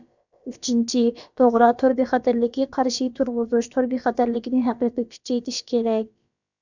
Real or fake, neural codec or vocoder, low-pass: fake; codec, 16 kHz, about 1 kbps, DyCAST, with the encoder's durations; 7.2 kHz